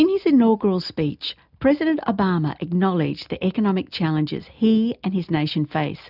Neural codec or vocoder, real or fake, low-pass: none; real; 5.4 kHz